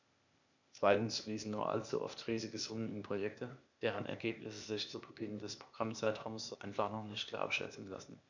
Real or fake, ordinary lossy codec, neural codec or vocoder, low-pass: fake; none; codec, 16 kHz, 0.8 kbps, ZipCodec; 7.2 kHz